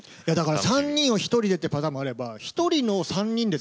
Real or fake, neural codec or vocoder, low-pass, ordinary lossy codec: real; none; none; none